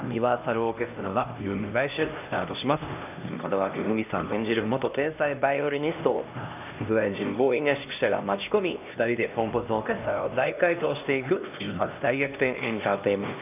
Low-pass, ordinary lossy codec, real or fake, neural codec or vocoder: 3.6 kHz; none; fake; codec, 16 kHz, 1 kbps, X-Codec, HuBERT features, trained on LibriSpeech